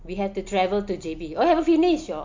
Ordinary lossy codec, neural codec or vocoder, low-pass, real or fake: MP3, 48 kbps; none; 7.2 kHz; real